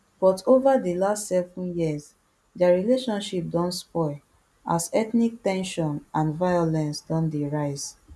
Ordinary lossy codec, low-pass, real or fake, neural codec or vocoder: none; none; real; none